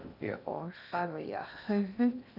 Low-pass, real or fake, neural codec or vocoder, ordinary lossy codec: 5.4 kHz; fake; codec, 16 kHz in and 24 kHz out, 0.6 kbps, FocalCodec, streaming, 2048 codes; none